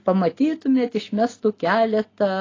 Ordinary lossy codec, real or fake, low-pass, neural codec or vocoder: AAC, 32 kbps; real; 7.2 kHz; none